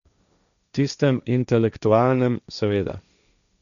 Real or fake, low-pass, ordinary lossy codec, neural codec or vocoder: fake; 7.2 kHz; none; codec, 16 kHz, 1.1 kbps, Voila-Tokenizer